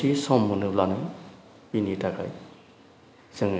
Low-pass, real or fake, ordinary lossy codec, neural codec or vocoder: none; real; none; none